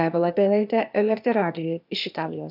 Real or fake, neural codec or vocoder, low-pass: fake; codec, 16 kHz, 0.8 kbps, ZipCodec; 5.4 kHz